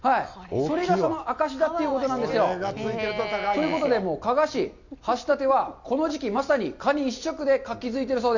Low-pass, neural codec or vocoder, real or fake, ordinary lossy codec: 7.2 kHz; none; real; none